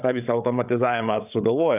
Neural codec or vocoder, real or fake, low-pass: codec, 16 kHz, 8 kbps, FreqCodec, larger model; fake; 3.6 kHz